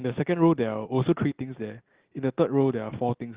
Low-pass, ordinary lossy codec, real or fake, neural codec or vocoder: 3.6 kHz; Opus, 16 kbps; real; none